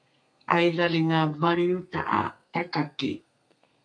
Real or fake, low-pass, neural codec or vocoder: fake; 9.9 kHz; codec, 32 kHz, 1.9 kbps, SNAC